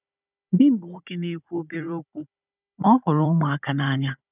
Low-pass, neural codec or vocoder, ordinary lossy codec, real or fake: 3.6 kHz; codec, 16 kHz, 16 kbps, FunCodec, trained on Chinese and English, 50 frames a second; none; fake